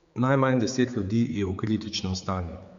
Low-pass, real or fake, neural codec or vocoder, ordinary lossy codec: 7.2 kHz; fake; codec, 16 kHz, 4 kbps, X-Codec, HuBERT features, trained on general audio; Opus, 64 kbps